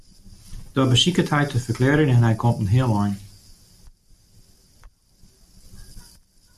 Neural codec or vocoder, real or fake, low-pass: none; real; 14.4 kHz